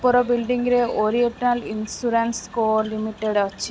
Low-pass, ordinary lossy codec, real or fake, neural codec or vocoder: none; none; real; none